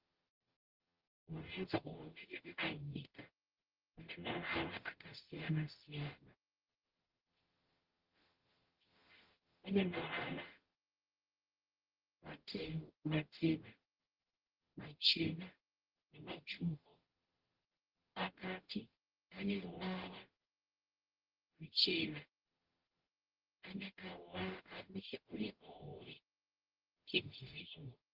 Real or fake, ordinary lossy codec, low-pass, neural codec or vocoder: fake; Opus, 16 kbps; 5.4 kHz; codec, 44.1 kHz, 0.9 kbps, DAC